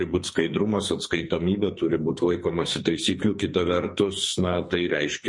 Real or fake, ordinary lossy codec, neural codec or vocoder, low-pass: fake; MP3, 48 kbps; codec, 44.1 kHz, 2.6 kbps, SNAC; 10.8 kHz